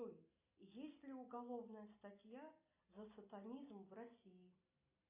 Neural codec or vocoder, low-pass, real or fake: none; 3.6 kHz; real